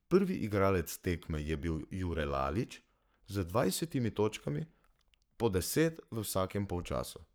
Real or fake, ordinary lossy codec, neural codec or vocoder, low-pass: fake; none; codec, 44.1 kHz, 7.8 kbps, Pupu-Codec; none